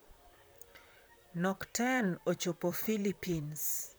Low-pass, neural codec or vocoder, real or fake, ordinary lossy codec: none; vocoder, 44.1 kHz, 128 mel bands, Pupu-Vocoder; fake; none